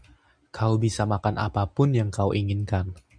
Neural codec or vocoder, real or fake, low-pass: none; real; 9.9 kHz